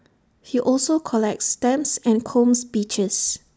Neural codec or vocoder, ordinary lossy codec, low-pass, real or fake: none; none; none; real